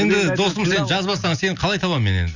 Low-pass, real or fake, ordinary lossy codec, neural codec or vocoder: 7.2 kHz; real; none; none